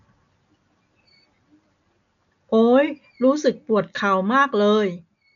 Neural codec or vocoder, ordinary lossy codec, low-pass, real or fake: none; none; 7.2 kHz; real